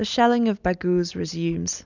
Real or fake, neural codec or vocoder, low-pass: real; none; 7.2 kHz